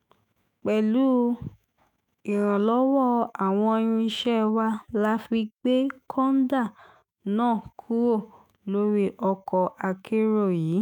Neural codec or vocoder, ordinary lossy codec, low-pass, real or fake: autoencoder, 48 kHz, 128 numbers a frame, DAC-VAE, trained on Japanese speech; none; 19.8 kHz; fake